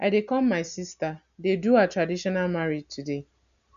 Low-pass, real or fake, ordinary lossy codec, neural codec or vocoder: 7.2 kHz; real; none; none